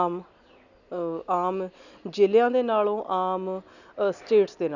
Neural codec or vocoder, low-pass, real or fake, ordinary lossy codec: none; 7.2 kHz; real; none